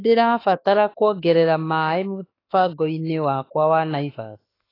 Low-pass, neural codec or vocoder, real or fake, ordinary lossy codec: 5.4 kHz; autoencoder, 48 kHz, 32 numbers a frame, DAC-VAE, trained on Japanese speech; fake; AAC, 24 kbps